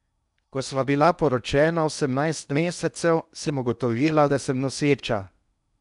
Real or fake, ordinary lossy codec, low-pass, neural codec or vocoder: fake; none; 10.8 kHz; codec, 16 kHz in and 24 kHz out, 0.8 kbps, FocalCodec, streaming, 65536 codes